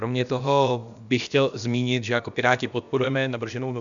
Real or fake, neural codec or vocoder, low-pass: fake; codec, 16 kHz, about 1 kbps, DyCAST, with the encoder's durations; 7.2 kHz